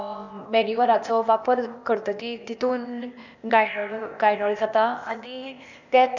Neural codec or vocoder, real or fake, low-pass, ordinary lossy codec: codec, 16 kHz, 0.8 kbps, ZipCodec; fake; 7.2 kHz; none